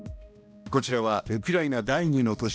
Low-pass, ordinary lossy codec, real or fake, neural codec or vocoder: none; none; fake; codec, 16 kHz, 1 kbps, X-Codec, HuBERT features, trained on balanced general audio